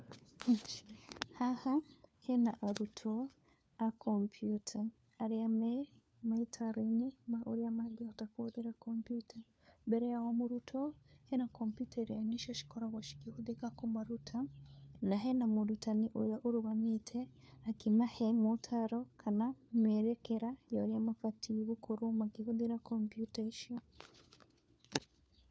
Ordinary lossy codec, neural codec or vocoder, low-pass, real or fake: none; codec, 16 kHz, 4 kbps, FunCodec, trained on LibriTTS, 50 frames a second; none; fake